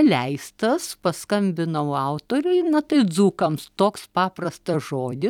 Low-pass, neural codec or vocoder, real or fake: 19.8 kHz; vocoder, 44.1 kHz, 128 mel bands every 256 samples, BigVGAN v2; fake